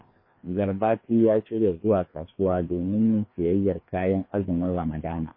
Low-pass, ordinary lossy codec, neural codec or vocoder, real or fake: 5.4 kHz; MP3, 24 kbps; codec, 16 kHz, 2 kbps, FreqCodec, larger model; fake